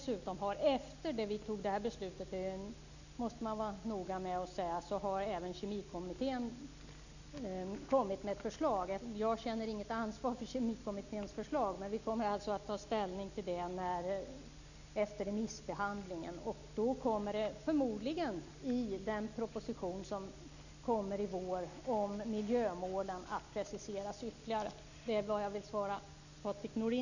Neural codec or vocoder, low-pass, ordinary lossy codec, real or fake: none; 7.2 kHz; none; real